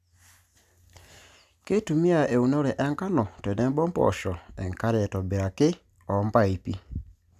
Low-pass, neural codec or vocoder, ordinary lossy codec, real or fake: 14.4 kHz; none; none; real